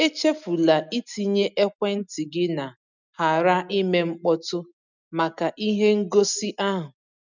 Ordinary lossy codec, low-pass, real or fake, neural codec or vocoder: none; 7.2 kHz; real; none